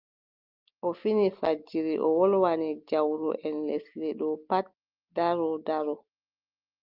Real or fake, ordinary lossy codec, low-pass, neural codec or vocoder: real; Opus, 24 kbps; 5.4 kHz; none